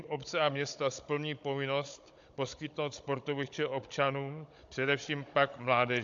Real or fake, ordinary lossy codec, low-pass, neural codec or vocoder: fake; AAC, 64 kbps; 7.2 kHz; codec, 16 kHz, 16 kbps, FunCodec, trained on Chinese and English, 50 frames a second